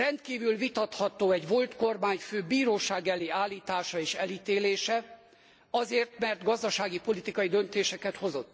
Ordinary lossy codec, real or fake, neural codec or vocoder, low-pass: none; real; none; none